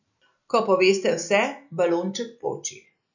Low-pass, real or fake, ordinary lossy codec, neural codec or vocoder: 7.2 kHz; real; none; none